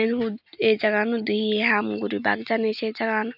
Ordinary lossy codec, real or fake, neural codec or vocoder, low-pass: none; real; none; 5.4 kHz